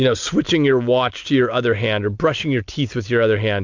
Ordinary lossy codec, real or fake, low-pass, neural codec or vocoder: MP3, 64 kbps; real; 7.2 kHz; none